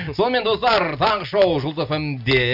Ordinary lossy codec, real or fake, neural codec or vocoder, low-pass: none; real; none; 5.4 kHz